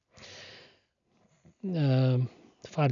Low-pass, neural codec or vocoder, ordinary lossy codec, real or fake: 7.2 kHz; none; none; real